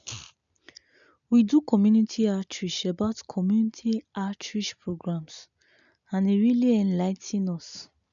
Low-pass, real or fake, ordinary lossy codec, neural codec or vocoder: 7.2 kHz; real; none; none